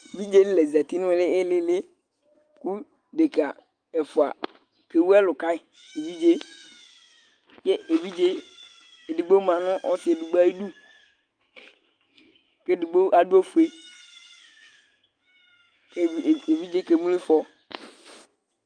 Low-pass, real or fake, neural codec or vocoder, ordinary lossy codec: 9.9 kHz; fake; autoencoder, 48 kHz, 128 numbers a frame, DAC-VAE, trained on Japanese speech; Opus, 32 kbps